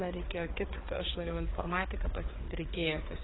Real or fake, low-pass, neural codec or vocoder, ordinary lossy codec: fake; 7.2 kHz; codec, 16 kHz, 4 kbps, FreqCodec, larger model; AAC, 16 kbps